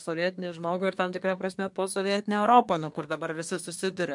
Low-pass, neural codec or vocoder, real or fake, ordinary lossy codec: 10.8 kHz; codec, 24 kHz, 1 kbps, SNAC; fake; MP3, 64 kbps